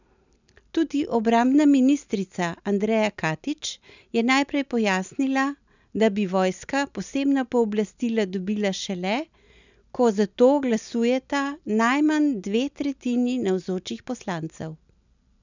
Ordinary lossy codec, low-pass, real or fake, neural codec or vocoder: none; 7.2 kHz; real; none